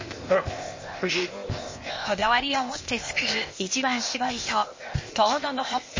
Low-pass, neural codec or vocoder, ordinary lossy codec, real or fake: 7.2 kHz; codec, 16 kHz, 0.8 kbps, ZipCodec; MP3, 32 kbps; fake